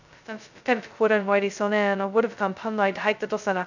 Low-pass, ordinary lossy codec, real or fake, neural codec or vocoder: 7.2 kHz; none; fake; codec, 16 kHz, 0.2 kbps, FocalCodec